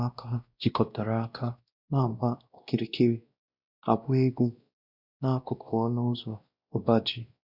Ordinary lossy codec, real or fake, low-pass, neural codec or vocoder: Opus, 64 kbps; fake; 5.4 kHz; codec, 16 kHz, 1 kbps, X-Codec, WavLM features, trained on Multilingual LibriSpeech